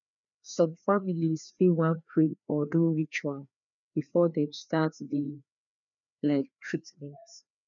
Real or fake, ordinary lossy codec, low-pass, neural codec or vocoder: fake; none; 7.2 kHz; codec, 16 kHz, 2 kbps, FreqCodec, larger model